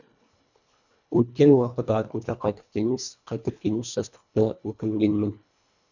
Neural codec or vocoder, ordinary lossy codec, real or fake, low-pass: codec, 24 kHz, 1.5 kbps, HILCodec; none; fake; 7.2 kHz